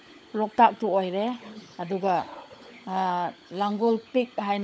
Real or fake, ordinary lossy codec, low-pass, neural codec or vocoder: fake; none; none; codec, 16 kHz, 16 kbps, FunCodec, trained on LibriTTS, 50 frames a second